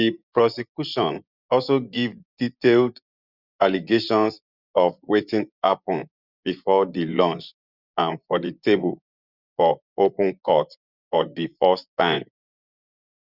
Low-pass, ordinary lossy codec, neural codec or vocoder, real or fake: 5.4 kHz; Opus, 64 kbps; vocoder, 24 kHz, 100 mel bands, Vocos; fake